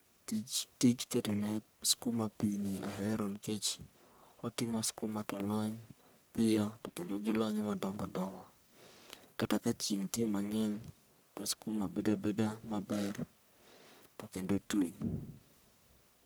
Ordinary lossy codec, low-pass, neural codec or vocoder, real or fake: none; none; codec, 44.1 kHz, 1.7 kbps, Pupu-Codec; fake